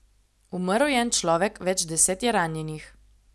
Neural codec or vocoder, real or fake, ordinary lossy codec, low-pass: none; real; none; none